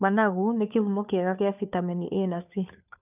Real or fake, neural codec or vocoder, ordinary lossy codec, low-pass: fake; codec, 16 kHz, 2 kbps, FunCodec, trained on Chinese and English, 25 frames a second; none; 3.6 kHz